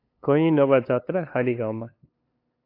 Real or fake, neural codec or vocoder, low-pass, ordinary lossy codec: fake; codec, 16 kHz, 2 kbps, FunCodec, trained on LibriTTS, 25 frames a second; 5.4 kHz; AAC, 32 kbps